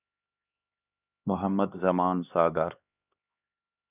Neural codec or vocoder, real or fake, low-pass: codec, 16 kHz, 2 kbps, X-Codec, HuBERT features, trained on LibriSpeech; fake; 3.6 kHz